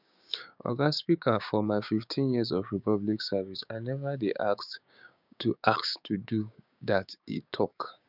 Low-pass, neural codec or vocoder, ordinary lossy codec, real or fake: 5.4 kHz; codec, 16 kHz, 6 kbps, DAC; none; fake